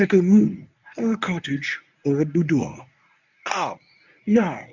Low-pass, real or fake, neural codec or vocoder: 7.2 kHz; fake; codec, 24 kHz, 0.9 kbps, WavTokenizer, medium speech release version 1